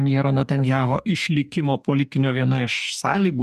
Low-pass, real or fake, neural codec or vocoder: 14.4 kHz; fake; codec, 44.1 kHz, 2.6 kbps, DAC